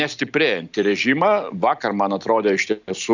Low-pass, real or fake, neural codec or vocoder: 7.2 kHz; real; none